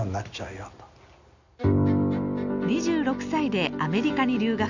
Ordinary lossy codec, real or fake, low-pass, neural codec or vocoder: none; real; 7.2 kHz; none